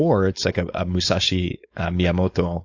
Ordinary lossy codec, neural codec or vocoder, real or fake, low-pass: AAC, 48 kbps; codec, 16 kHz, 4.8 kbps, FACodec; fake; 7.2 kHz